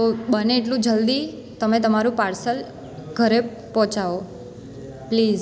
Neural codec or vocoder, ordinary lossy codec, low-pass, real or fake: none; none; none; real